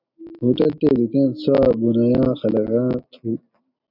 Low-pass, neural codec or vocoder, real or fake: 5.4 kHz; none; real